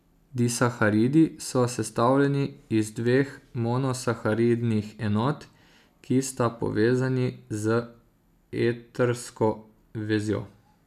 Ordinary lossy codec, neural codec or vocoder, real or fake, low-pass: none; none; real; 14.4 kHz